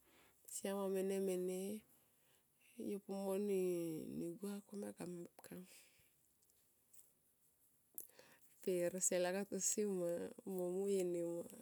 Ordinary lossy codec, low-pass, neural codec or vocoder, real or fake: none; none; none; real